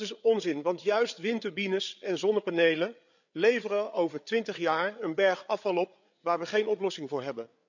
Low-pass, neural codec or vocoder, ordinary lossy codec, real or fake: 7.2 kHz; codec, 16 kHz, 8 kbps, FreqCodec, larger model; none; fake